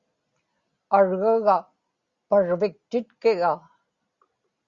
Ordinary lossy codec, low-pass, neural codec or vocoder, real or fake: Opus, 64 kbps; 7.2 kHz; none; real